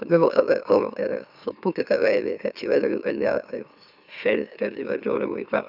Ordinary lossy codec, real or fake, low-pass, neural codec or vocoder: none; fake; 5.4 kHz; autoencoder, 44.1 kHz, a latent of 192 numbers a frame, MeloTTS